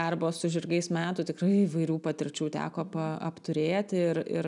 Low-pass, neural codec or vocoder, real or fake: 10.8 kHz; none; real